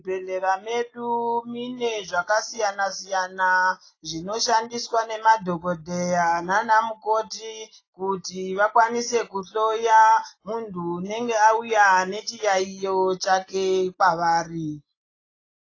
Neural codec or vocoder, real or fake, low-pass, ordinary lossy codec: none; real; 7.2 kHz; AAC, 32 kbps